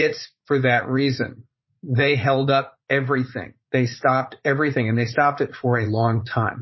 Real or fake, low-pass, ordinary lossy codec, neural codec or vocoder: fake; 7.2 kHz; MP3, 24 kbps; vocoder, 44.1 kHz, 80 mel bands, Vocos